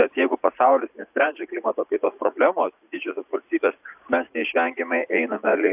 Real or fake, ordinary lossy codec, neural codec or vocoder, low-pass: fake; AAC, 32 kbps; vocoder, 44.1 kHz, 80 mel bands, Vocos; 3.6 kHz